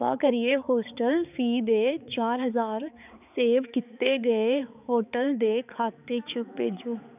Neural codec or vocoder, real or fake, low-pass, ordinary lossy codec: codec, 16 kHz, 4 kbps, X-Codec, HuBERT features, trained on balanced general audio; fake; 3.6 kHz; none